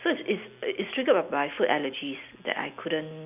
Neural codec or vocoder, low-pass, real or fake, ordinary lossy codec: none; 3.6 kHz; real; none